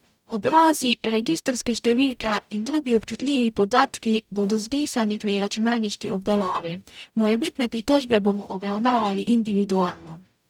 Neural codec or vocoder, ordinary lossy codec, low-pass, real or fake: codec, 44.1 kHz, 0.9 kbps, DAC; none; 19.8 kHz; fake